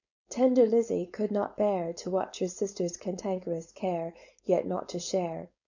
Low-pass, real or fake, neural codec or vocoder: 7.2 kHz; fake; codec, 16 kHz, 4.8 kbps, FACodec